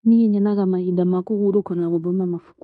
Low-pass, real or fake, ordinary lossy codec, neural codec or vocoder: 10.8 kHz; fake; none; codec, 16 kHz in and 24 kHz out, 0.9 kbps, LongCat-Audio-Codec, fine tuned four codebook decoder